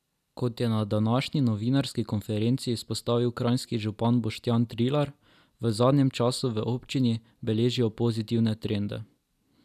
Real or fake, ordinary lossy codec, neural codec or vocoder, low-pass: real; none; none; 14.4 kHz